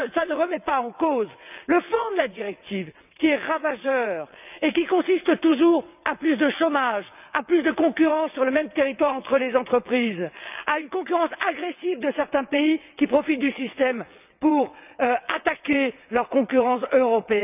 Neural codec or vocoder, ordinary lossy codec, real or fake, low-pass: vocoder, 22.05 kHz, 80 mel bands, WaveNeXt; none; fake; 3.6 kHz